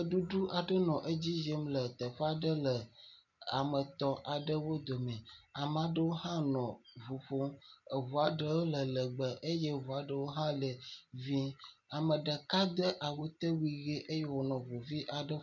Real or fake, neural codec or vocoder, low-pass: real; none; 7.2 kHz